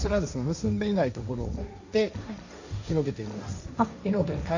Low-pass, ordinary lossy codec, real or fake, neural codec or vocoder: 7.2 kHz; none; fake; codec, 16 kHz, 1.1 kbps, Voila-Tokenizer